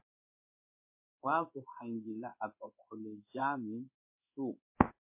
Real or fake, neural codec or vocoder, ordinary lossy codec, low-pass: fake; codec, 16 kHz in and 24 kHz out, 1 kbps, XY-Tokenizer; MP3, 24 kbps; 3.6 kHz